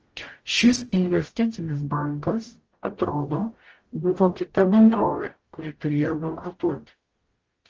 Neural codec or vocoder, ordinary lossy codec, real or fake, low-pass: codec, 44.1 kHz, 0.9 kbps, DAC; Opus, 16 kbps; fake; 7.2 kHz